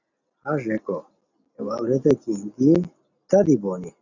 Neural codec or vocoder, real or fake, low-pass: none; real; 7.2 kHz